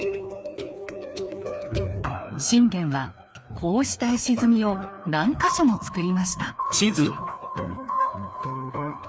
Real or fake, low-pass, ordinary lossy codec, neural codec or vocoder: fake; none; none; codec, 16 kHz, 2 kbps, FreqCodec, larger model